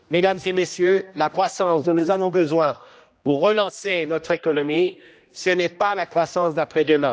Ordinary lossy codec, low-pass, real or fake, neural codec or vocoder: none; none; fake; codec, 16 kHz, 1 kbps, X-Codec, HuBERT features, trained on general audio